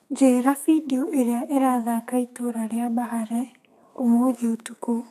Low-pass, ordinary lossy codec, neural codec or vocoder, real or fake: 14.4 kHz; none; codec, 32 kHz, 1.9 kbps, SNAC; fake